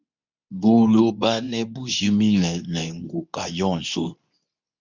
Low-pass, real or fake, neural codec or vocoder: 7.2 kHz; fake; codec, 24 kHz, 0.9 kbps, WavTokenizer, medium speech release version 2